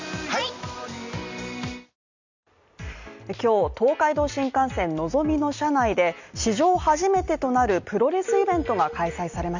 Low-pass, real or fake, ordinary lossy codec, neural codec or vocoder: 7.2 kHz; real; Opus, 64 kbps; none